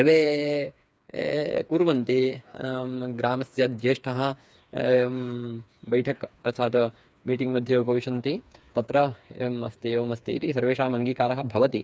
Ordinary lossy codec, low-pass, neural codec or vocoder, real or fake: none; none; codec, 16 kHz, 4 kbps, FreqCodec, smaller model; fake